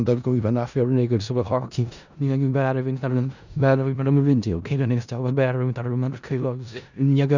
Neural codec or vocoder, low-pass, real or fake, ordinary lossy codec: codec, 16 kHz in and 24 kHz out, 0.4 kbps, LongCat-Audio-Codec, four codebook decoder; 7.2 kHz; fake; none